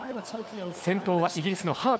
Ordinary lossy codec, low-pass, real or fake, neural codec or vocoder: none; none; fake; codec, 16 kHz, 4 kbps, FunCodec, trained on LibriTTS, 50 frames a second